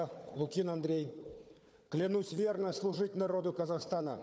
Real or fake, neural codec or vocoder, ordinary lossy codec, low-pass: fake; codec, 16 kHz, 4 kbps, FunCodec, trained on Chinese and English, 50 frames a second; none; none